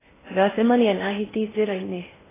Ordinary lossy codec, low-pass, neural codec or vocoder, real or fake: AAC, 16 kbps; 3.6 kHz; codec, 16 kHz in and 24 kHz out, 0.6 kbps, FocalCodec, streaming, 2048 codes; fake